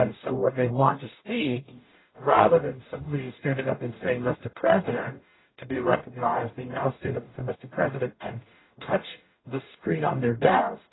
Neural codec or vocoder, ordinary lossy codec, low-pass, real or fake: codec, 44.1 kHz, 0.9 kbps, DAC; AAC, 16 kbps; 7.2 kHz; fake